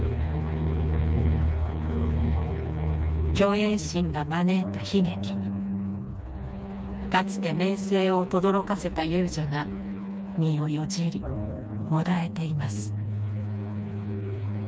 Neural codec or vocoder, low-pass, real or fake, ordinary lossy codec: codec, 16 kHz, 2 kbps, FreqCodec, smaller model; none; fake; none